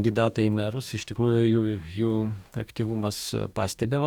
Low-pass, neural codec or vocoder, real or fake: 19.8 kHz; codec, 44.1 kHz, 2.6 kbps, DAC; fake